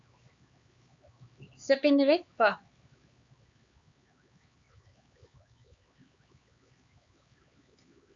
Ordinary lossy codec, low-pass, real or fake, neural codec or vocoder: Opus, 64 kbps; 7.2 kHz; fake; codec, 16 kHz, 4 kbps, X-Codec, HuBERT features, trained on LibriSpeech